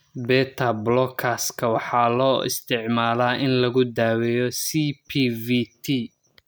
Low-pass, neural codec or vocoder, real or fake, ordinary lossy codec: none; none; real; none